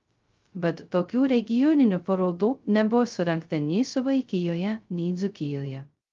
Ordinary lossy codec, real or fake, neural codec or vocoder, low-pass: Opus, 32 kbps; fake; codec, 16 kHz, 0.2 kbps, FocalCodec; 7.2 kHz